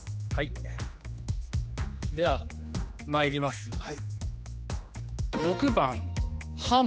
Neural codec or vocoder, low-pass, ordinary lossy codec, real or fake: codec, 16 kHz, 2 kbps, X-Codec, HuBERT features, trained on general audio; none; none; fake